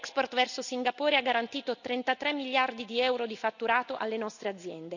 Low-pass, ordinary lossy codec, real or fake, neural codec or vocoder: 7.2 kHz; none; real; none